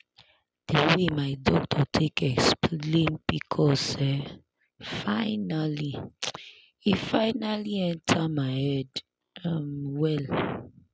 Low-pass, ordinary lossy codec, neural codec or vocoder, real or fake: none; none; none; real